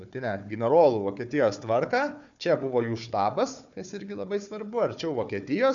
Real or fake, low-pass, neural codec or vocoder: fake; 7.2 kHz; codec, 16 kHz, 4 kbps, FunCodec, trained on Chinese and English, 50 frames a second